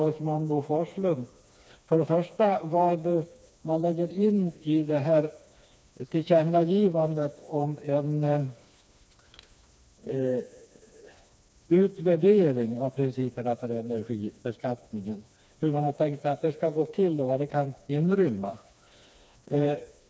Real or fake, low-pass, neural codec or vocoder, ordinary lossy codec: fake; none; codec, 16 kHz, 2 kbps, FreqCodec, smaller model; none